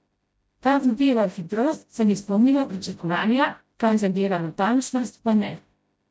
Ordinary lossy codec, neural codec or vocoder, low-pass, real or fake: none; codec, 16 kHz, 0.5 kbps, FreqCodec, smaller model; none; fake